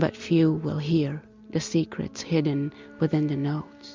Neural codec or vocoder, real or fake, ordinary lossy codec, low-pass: none; real; MP3, 64 kbps; 7.2 kHz